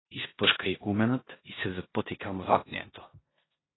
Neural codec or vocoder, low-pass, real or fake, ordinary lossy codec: codec, 16 kHz in and 24 kHz out, 0.9 kbps, LongCat-Audio-Codec, four codebook decoder; 7.2 kHz; fake; AAC, 16 kbps